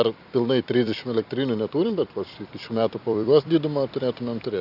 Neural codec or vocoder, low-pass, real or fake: vocoder, 44.1 kHz, 128 mel bands every 256 samples, BigVGAN v2; 5.4 kHz; fake